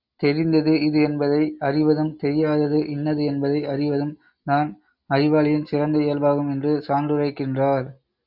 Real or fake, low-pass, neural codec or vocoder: real; 5.4 kHz; none